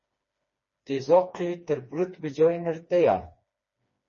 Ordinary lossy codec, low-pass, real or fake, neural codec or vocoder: MP3, 32 kbps; 7.2 kHz; fake; codec, 16 kHz, 2 kbps, FreqCodec, smaller model